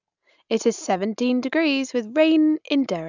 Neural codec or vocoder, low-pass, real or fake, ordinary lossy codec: none; 7.2 kHz; real; none